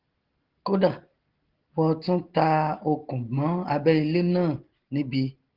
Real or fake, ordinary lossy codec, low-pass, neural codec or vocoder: real; Opus, 16 kbps; 5.4 kHz; none